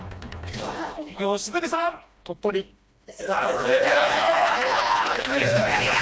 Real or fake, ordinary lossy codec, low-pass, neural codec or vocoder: fake; none; none; codec, 16 kHz, 1 kbps, FreqCodec, smaller model